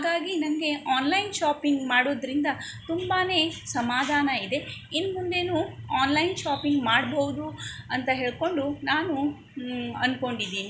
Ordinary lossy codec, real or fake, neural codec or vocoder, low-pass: none; real; none; none